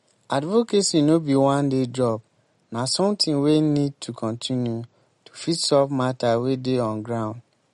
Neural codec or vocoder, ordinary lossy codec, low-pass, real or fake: none; MP3, 48 kbps; 19.8 kHz; real